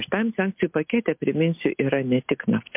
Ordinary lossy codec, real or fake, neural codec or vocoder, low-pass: MP3, 32 kbps; real; none; 3.6 kHz